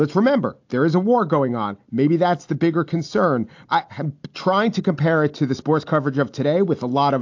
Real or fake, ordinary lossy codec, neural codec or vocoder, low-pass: real; AAC, 48 kbps; none; 7.2 kHz